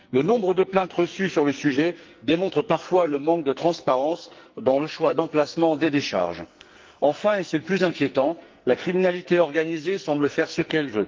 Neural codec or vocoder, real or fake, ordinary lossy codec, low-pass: codec, 44.1 kHz, 2.6 kbps, SNAC; fake; Opus, 24 kbps; 7.2 kHz